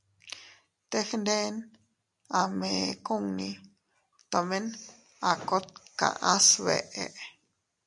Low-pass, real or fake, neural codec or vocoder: 10.8 kHz; real; none